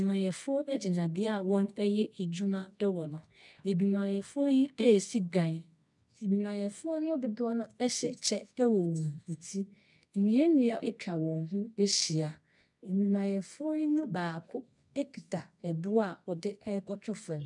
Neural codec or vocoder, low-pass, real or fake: codec, 24 kHz, 0.9 kbps, WavTokenizer, medium music audio release; 10.8 kHz; fake